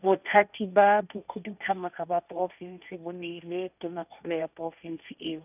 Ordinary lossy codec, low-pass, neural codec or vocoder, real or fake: none; 3.6 kHz; codec, 16 kHz, 1.1 kbps, Voila-Tokenizer; fake